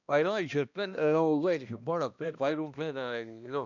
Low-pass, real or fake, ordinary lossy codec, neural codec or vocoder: 7.2 kHz; fake; none; codec, 16 kHz, 1 kbps, X-Codec, HuBERT features, trained on balanced general audio